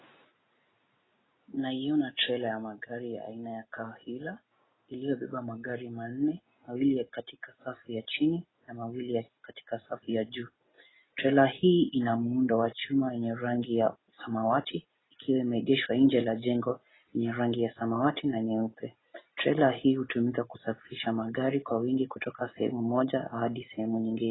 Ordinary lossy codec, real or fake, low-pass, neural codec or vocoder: AAC, 16 kbps; real; 7.2 kHz; none